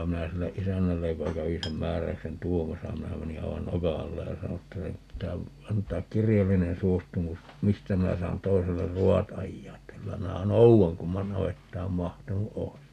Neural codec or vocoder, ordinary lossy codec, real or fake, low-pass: none; none; real; 14.4 kHz